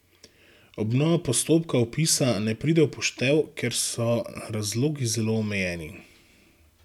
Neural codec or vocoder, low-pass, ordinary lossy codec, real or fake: none; 19.8 kHz; none; real